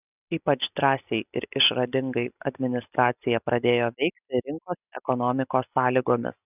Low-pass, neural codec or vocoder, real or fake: 3.6 kHz; none; real